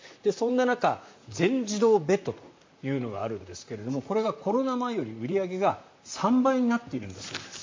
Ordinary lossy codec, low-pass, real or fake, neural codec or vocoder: MP3, 48 kbps; 7.2 kHz; fake; vocoder, 44.1 kHz, 128 mel bands, Pupu-Vocoder